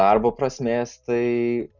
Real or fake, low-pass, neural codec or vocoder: real; 7.2 kHz; none